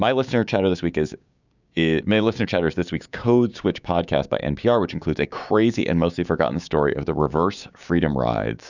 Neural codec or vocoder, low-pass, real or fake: codec, 16 kHz, 6 kbps, DAC; 7.2 kHz; fake